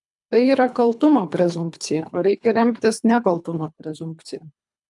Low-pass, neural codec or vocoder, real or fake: 10.8 kHz; codec, 24 kHz, 3 kbps, HILCodec; fake